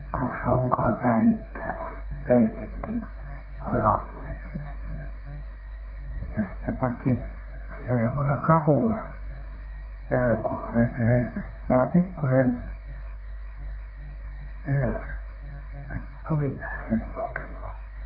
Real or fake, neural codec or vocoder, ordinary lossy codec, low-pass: fake; codec, 24 kHz, 1 kbps, SNAC; none; 5.4 kHz